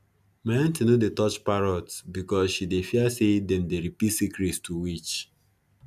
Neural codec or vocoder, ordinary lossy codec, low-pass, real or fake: none; none; 14.4 kHz; real